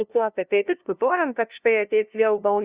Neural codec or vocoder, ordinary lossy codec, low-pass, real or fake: codec, 16 kHz, 0.5 kbps, FunCodec, trained on LibriTTS, 25 frames a second; Opus, 64 kbps; 3.6 kHz; fake